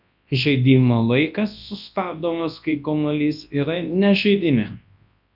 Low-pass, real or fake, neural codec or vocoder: 5.4 kHz; fake; codec, 24 kHz, 0.9 kbps, WavTokenizer, large speech release